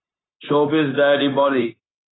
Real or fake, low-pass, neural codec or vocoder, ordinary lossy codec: fake; 7.2 kHz; codec, 16 kHz, 0.9 kbps, LongCat-Audio-Codec; AAC, 16 kbps